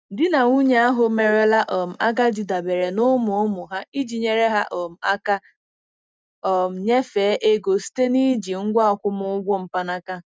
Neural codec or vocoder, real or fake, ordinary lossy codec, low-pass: none; real; none; none